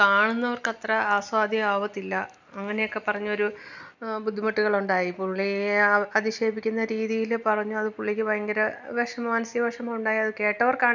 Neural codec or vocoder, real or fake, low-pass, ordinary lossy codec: none; real; 7.2 kHz; none